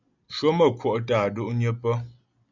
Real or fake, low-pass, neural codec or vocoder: real; 7.2 kHz; none